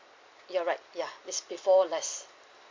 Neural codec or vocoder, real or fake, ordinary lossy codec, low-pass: none; real; MP3, 48 kbps; 7.2 kHz